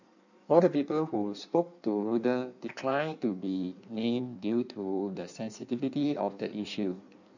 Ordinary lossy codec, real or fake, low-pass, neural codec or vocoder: none; fake; 7.2 kHz; codec, 16 kHz in and 24 kHz out, 1.1 kbps, FireRedTTS-2 codec